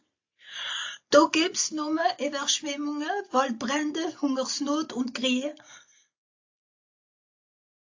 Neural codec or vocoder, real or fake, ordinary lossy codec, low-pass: none; real; AAC, 48 kbps; 7.2 kHz